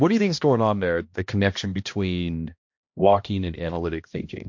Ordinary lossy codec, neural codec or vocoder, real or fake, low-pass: MP3, 48 kbps; codec, 16 kHz, 1 kbps, X-Codec, HuBERT features, trained on balanced general audio; fake; 7.2 kHz